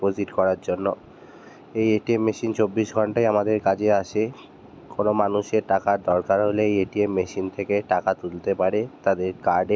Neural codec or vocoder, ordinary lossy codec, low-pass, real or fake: none; none; none; real